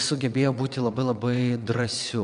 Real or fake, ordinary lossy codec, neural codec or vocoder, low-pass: fake; MP3, 96 kbps; vocoder, 22.05 kHz, 80 mel bands, WaveNeXt; 9.9 kHz